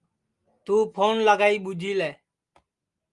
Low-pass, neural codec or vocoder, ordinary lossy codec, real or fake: 10.8 kHz; none; Opus, 32 kbps; real